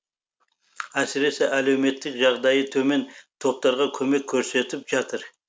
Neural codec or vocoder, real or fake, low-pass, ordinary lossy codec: none; real; none; none